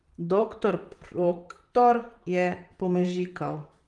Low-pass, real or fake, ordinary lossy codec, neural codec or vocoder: 10.8 kHz; fake; Opus, 24 kbps; vocoder, 44.1 kHz, 128 mel bands, Pupu-Vocoder